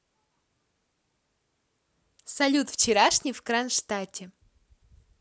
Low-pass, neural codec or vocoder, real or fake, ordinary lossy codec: none; none; real; none